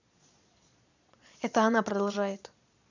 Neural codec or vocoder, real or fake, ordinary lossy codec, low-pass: vocoder, 22.05 kHz, 80 mel bands, Vocos; fake; none; 7.2 kHz